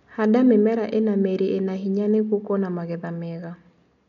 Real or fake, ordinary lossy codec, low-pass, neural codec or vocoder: real; none; 7.2 kHz; none